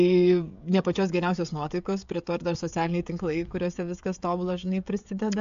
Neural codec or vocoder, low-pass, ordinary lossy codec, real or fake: codec, 16 kHz, 16 kbps, FreqCodec, smaller model; 7.2 kHz; AAC, 64 kbps; fake